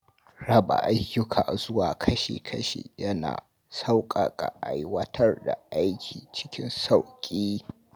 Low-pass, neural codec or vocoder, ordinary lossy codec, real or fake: none; none; none; real